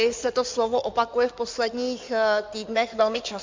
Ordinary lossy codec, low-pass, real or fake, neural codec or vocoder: MP3, 64 kbps; 7.2 kHz; fake; codec, 16 kHz in and 24 kHz out, 2.2 kbps, FireRedTTS-2 codec